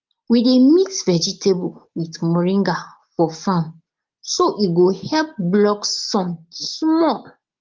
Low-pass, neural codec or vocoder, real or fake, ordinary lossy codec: 7.2 kHz; none; real; Opus, 24 kbps